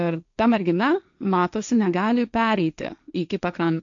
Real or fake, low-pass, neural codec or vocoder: fake; 7.2 kHz; codec, 16 kHz, 1.1 kbps, Voila-Tokenizer